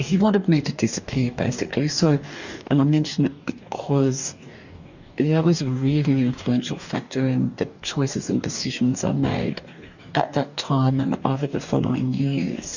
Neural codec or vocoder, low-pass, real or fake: codec, 44.1 kHz, 2.6 kbps, DAC; 7.2 kHz; fake